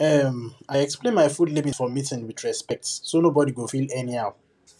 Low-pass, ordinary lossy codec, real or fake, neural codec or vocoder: none; none; real; none